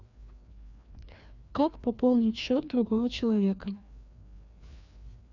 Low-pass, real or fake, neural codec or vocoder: 7.2 kHz; fake; codec, 16 kHz, 2 kbps, FreqCodec, larger model